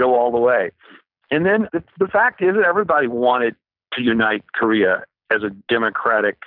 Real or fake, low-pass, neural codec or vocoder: real; 5.4 kHz; none